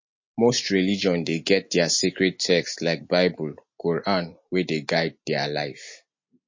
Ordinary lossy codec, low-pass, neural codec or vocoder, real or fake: MP3, 32 kbps; 7.2 kHz; none; real